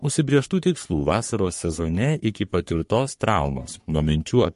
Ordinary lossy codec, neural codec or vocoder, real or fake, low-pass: MP3, 48 kbps; codec, 44.1 kHz, 3.4 kbps, Pupu-Codec; fake; 14.4 kHz